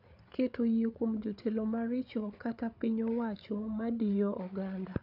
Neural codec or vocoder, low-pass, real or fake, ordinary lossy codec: codec, 16 kHz, 8 kbps, FreqCodec, larger model; 5.4 kHz; fake; none